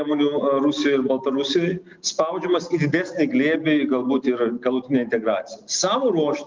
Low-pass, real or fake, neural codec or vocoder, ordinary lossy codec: 7.2 kHz; real; none; Opus, 24 kbps